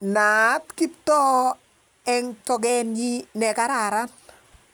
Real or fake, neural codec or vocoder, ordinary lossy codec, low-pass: real; none; none; none